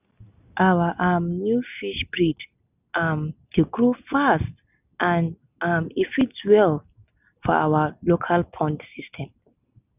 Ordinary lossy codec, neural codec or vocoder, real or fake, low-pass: none; none; real; 3.6 kHz